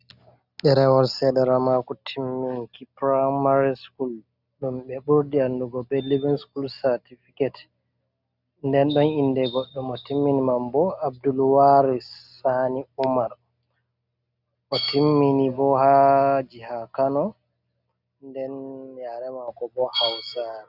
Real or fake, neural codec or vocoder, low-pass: real; none; 5.4 kHz